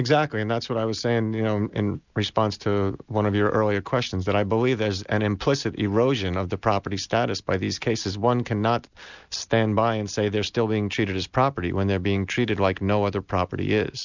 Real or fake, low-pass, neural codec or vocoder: real; 7.2 kHz; none